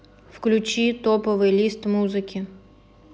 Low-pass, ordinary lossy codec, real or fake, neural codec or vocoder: none; none; real; none